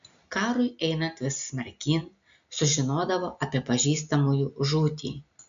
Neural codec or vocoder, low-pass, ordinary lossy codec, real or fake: none; 7.2 kHz; MP3, 64 kbps; real